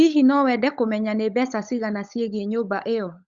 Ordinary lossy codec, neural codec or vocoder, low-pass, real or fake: Opus, 64 kbps; codec, 16 kHz, 16 kbps, FunCodec, trained on LibriTTS, 50 frames a second; 7.2 kHz; fake